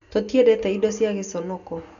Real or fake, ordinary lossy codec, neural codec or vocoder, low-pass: real; none; none; 7.2 kHz